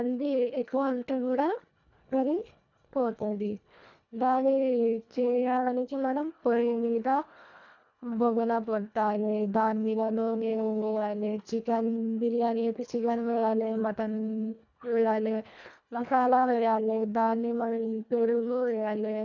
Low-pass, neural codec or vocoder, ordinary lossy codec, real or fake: 7.2 kHz; codec, 24 kHz, 1.5 kbps, HILCodec; none; fake